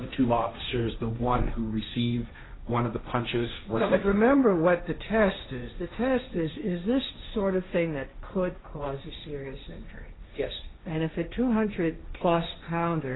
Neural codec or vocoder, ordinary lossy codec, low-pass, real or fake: codec, 16 kHz, 1.1 kbps, Voila-Tokenizer; AAC, 16 kbps; 7.2 kHz; fake